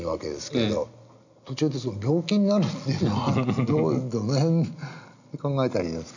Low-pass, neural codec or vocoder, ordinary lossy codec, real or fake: 7.2 kHz; none; none; real